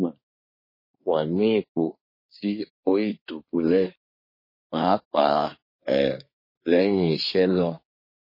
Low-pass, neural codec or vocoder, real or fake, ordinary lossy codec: 5.4 kHz; codec, 24 kHz, 1 kbps, SNAC; fake; MP3, 24 kbps